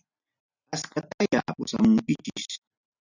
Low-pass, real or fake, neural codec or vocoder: 7.2 kHz; real; none